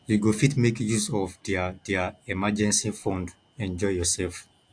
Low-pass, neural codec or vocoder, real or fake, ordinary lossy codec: 9.9 kHz; none; real; AAC, 64 kbps